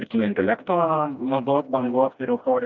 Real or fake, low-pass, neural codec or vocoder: fake; 7.2 kHz; codec, 16 kHz, 1 kbps, FreqCodec, smaller model